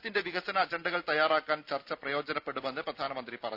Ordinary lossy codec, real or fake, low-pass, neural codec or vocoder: none; real; 5.4 kHz; none